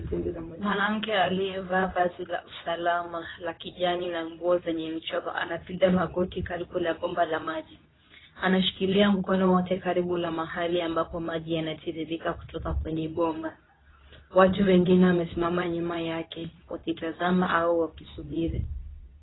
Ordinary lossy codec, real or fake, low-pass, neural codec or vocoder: AAC, 16 kbps; fake; 7.2 kHz; codec, 24 kHz, 0.9 kbps, WavTokenizer, medium speech release version 2